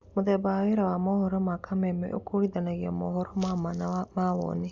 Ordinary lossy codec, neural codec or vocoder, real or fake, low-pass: Opus, 64 kbps; none; real; 7.2 kHz